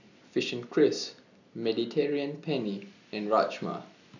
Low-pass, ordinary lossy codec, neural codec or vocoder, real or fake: 7.2 kHz; none; none; real